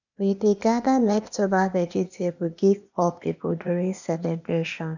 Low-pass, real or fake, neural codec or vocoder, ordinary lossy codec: 7.2 kHz; fake; codec, 16 kHz, 0.8 kbps, ZipCodec; none